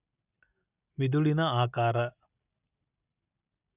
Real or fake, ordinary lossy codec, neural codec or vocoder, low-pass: real; none; none; 3.6 kHz